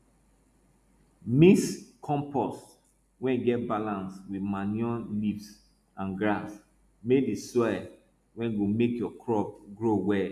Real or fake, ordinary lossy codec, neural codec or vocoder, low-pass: real; none; none; 14.4 kHz